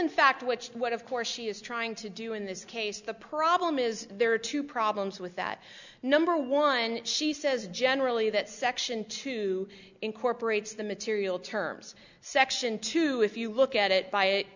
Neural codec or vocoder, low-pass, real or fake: none; 7.2 kHz; real